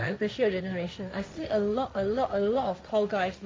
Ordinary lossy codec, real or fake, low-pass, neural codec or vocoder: none; fake; none; codec, 16 kHz, 1.1 kbps, Voila-Tokenizer